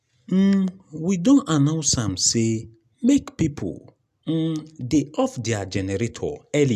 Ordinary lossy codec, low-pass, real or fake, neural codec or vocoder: none; 14.4 kHz; real; none